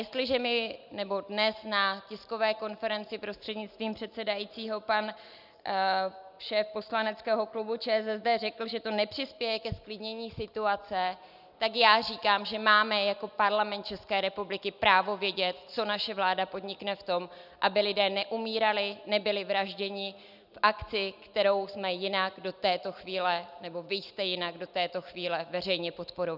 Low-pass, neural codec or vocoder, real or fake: 5.4 kHz; none; real